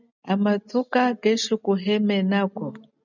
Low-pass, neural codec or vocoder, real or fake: 7.2 kHz; none; real